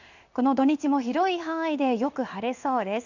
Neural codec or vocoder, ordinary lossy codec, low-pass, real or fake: codec, 16 kHz in and 24 kHz out, 1 kbps, XY-Tokenizer; none; 7.2 kHz; fake